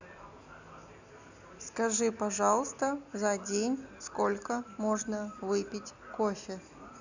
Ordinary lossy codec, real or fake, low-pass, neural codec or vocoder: none; real; 7.2 kHz; none